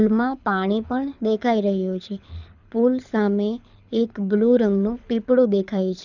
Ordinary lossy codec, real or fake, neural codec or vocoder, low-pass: none; fake; codec, 24 kHz, 6 kbps, HILCodec; 7.2 kHz